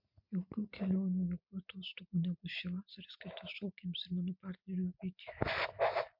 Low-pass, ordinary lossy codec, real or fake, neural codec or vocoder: 5.4 kHz; MP3, 48 kbps; real; none